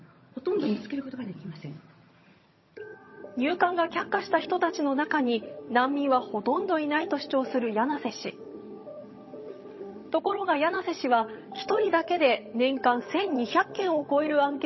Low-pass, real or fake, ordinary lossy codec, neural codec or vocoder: 7.2 kHz; fake; MP3, 24 kbps; vocoder, 22.05 kHz, 80 mel bands, HiFi-GAN